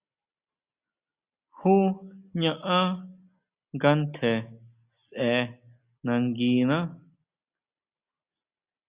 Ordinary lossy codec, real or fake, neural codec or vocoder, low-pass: Opus, 64 kbps; real; none; 3.6 kHz